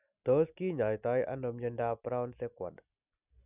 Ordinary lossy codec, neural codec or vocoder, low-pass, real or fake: none; none; 3.6 kHz; real